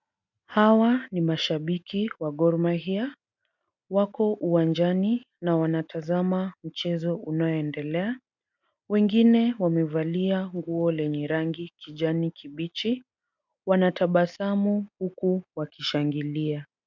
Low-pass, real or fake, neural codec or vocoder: 7.2 kHz; real; none